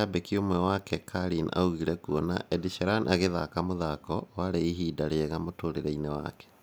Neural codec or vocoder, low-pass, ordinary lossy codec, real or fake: none; none; none; real